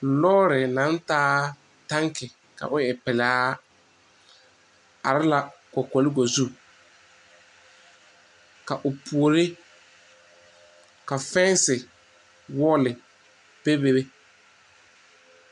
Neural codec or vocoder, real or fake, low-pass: none; real; 10.8 kHz